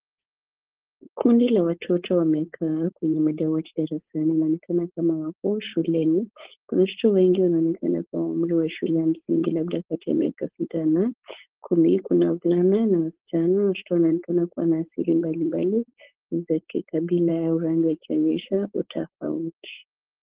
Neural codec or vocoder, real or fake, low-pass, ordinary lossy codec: codec, 16 kHz, 4.8 kbps, FACodec; fake; 3.6 kHz; Opus, 16 kbps